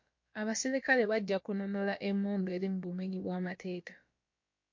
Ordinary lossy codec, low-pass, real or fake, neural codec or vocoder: MP3, 48 kbps; 7.2 kHz; fake; codec, 16 kHz, about 1 kbps, DyCAST, with the encoder's durations